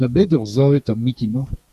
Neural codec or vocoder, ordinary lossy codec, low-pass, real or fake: codec, 32 kHz, 1.9 kbps, SNAC; Opus, 64 kbps; 14.4 kHz; fake